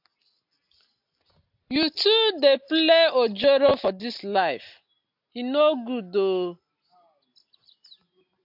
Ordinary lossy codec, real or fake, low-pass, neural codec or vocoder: AAC, 48 kbps; real; 5.4 kHz; none